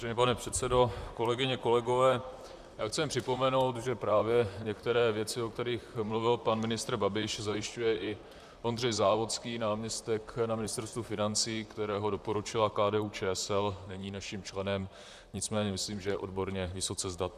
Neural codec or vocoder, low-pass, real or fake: vocoder, 44.1 kHz, 128 mel bands, Pupu-Vocoder; 14.4 kHz; fake